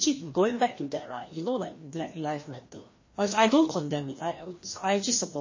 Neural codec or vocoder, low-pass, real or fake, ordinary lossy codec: codec, 16 kHz, 1 kbps, FreqCodec, larger model; 7.2 kHz; fake; MP3, 32 kbps